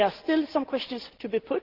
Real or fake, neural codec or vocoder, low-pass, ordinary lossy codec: real; none; 5.4 kHz; Opus, 16 kbps